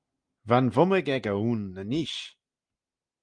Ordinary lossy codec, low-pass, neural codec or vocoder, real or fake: Opus, 32 kbps; 9.9 kHz; none; real